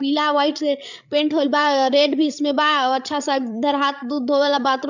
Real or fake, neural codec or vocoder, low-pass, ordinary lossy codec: fake; codec, 16 kHz, 8 kbps, FreqCodec, larger model; 7.2 kHz; none